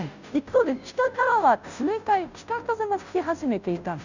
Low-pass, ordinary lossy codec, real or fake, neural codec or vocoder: 7.2 kHz; none; fake; codec, 16 kHz, 0.5 kbps, FunCodec, trained on Chinese and English, 25 frames a second